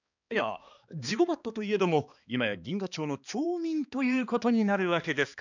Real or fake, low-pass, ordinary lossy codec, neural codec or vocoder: fake; 7.2 kHz; none; codec, 16 kHz, 2 kbps, X-Codec, HuBERT features, trained on balanced general audio